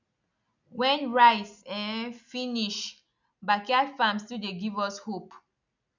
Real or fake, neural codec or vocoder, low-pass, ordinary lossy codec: real; none; 7.2 kHz; none